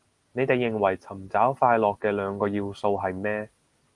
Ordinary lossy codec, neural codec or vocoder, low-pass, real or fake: Opus, 24 kbps; none; 10.8 kHz; real